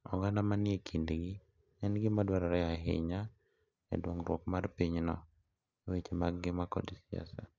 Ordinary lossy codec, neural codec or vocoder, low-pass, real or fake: none; none; 7.2 kHz; real